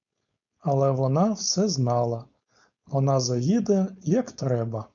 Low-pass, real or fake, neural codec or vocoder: 7.2 kHz; fake; codec, 16 kHz, 4.8 kbps, FACodec